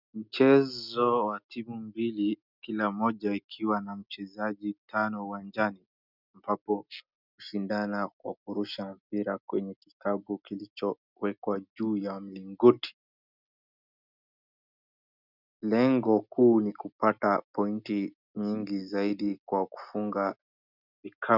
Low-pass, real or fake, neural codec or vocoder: 5.4 kHz; real; none